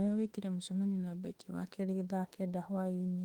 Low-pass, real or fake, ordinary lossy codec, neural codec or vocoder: 19.8 kHz; fake; Opus, 24 kbps; autoencoder, 48 kHz, 32 numbers a frame, DAC-VAE, trained on Japanese speech